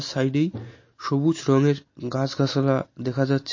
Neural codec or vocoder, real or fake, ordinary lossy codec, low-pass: none; real; MP3, 32 kbps; 7.2 kHz